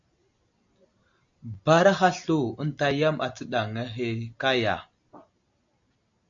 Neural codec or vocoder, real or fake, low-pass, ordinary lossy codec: none; real; 7.2 kHz; AAC, 64 kbps